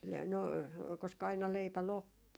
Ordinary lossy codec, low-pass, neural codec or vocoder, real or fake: none; none; codec, 44.1 kHz, 7.8 kbps, DAC; fake